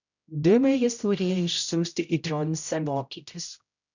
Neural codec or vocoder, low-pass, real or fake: codec, 16 kHz, 0.5 kbps, X-Codec, HuBERT features, trained on general audio; 7.2 kHz; fake